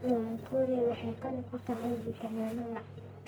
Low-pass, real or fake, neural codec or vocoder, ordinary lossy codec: none; fake; codec, 44.1 kHz, 1.7 kbps, Pupu-Codec; none